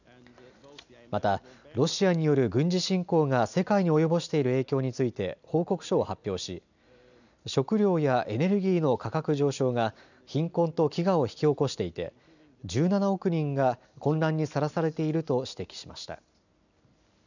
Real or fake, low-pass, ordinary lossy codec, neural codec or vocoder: real; 7.2 kHz; none; none